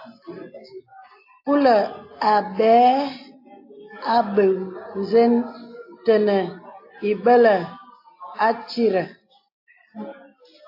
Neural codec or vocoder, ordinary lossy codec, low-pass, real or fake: none; AAC, 32 kbps; 5.4 kHz; real